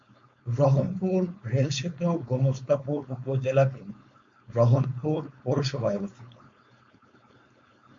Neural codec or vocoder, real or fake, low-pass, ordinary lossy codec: codec, 16 kHz, 4.8 kbps, FACodec; fake; 7.2 kHz; MP3, 48 kbps